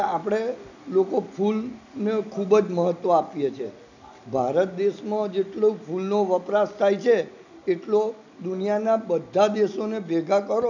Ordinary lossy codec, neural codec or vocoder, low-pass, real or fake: none; none; 7.2 kHz; real